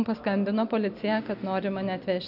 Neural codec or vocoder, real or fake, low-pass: none; real; 5.4 kHz